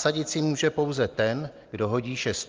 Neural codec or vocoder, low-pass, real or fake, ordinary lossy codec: none; 7.2 kHz; real; Opus, 32 kbps